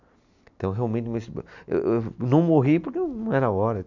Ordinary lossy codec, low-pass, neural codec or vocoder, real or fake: none; 7.2 kHz; none; real